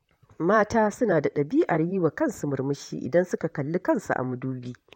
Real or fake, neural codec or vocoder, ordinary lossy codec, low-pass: fake; vocoder, 44.1 kHz, 128 mel bands, Pupu-Vocoder; MP3, 64 kbps; 19.8 kHz